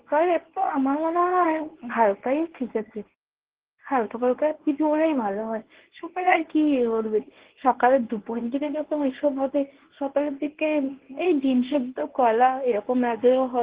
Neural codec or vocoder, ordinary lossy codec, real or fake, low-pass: codec, 24 kHz, 0.9 kbps, WavTokenizer, medium speech release version 1; Opus, 16 kbps; fake; 3.6 kHz